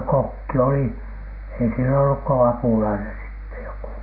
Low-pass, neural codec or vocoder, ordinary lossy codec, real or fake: 5.4 kHz; none; none; real